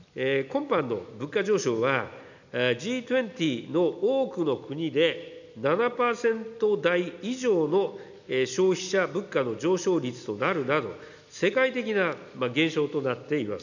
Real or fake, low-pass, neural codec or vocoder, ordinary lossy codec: real; 7.2 kHz; none; none